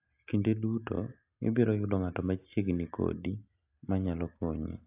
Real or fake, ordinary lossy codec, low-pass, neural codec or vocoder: real; AAC, 32 kbps; 3.6 kHz; none